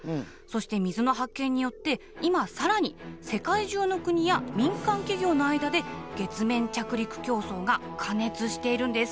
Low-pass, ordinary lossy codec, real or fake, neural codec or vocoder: none; none; real; none